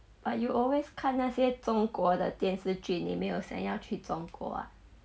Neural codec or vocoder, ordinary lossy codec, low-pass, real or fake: none; none; none; real